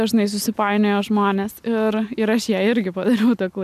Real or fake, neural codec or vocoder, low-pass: real; none; 14.4 kHz